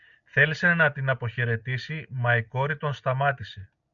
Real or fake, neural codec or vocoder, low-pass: real; none; 7.2 kHz